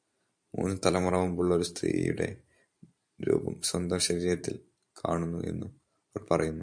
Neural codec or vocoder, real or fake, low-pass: vocoder, 44.1 kHz, 128 mel bands every 512 samples, BigVGAN v2; fake; 9.9 kHz